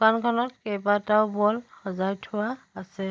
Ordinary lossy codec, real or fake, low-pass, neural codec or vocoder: none; real; none; none